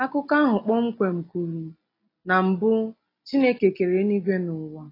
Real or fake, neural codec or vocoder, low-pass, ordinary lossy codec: real; none; 5.4 kHz; AAC, 24 kbps